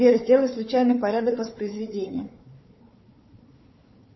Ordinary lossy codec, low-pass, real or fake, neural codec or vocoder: MP3, 24 kbps; 7.2 kHz; fake; codec, 16 kHz, 4 kbps, FunCodec, trained on Chinese and English, 50 frames a second